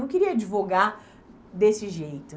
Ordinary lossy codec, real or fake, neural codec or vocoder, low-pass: none; real; none; none